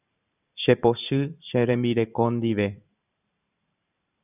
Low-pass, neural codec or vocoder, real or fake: 3.6 kHz; vocoder, 44.1 kHz, 128 mel bands every 512 samples, BigVGAN v2; fake